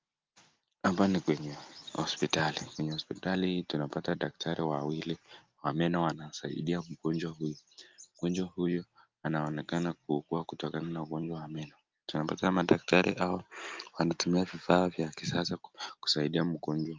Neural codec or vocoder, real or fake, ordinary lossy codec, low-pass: none; real; Opus, 32 kbps; 7.2 kHz